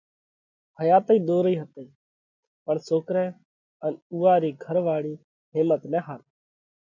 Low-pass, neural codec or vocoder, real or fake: 7.2 kHz; none; real